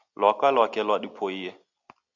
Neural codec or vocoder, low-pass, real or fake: none; 7.2 kHz; real